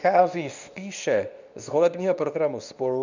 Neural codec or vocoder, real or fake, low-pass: codec, 24 kHz, 0.9 kbps, WavTokenizer, medium speech release version 2; fake; 7.2 kHz